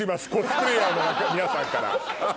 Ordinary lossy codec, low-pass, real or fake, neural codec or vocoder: none; none; real; none